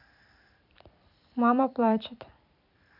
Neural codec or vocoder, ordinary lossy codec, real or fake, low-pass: vocoder, 44.1 kHz, 128 mel bands every 256 samples, BigVGAN v2; none; fake; 5.4 kHz